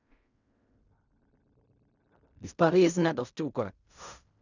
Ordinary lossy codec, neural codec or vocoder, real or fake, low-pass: none; codec, 16 kHz in and 24 kHz out, 0.4 kbps, LongCat-Audio-Codec, fine tuned four codebook decoder; fake; 7.2 kHz